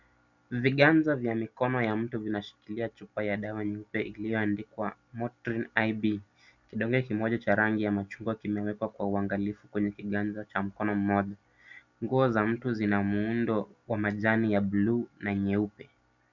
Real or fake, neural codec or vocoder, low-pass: real; none; 7.2 kHz